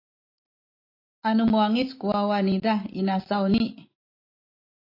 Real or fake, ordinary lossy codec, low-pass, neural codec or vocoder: real; AAC, 32 kbps; 5.4 kHz; none